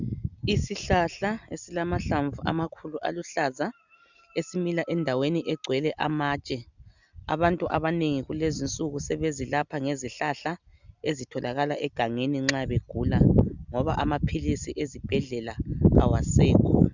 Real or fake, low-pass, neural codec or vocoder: real; 7.2 kHz; none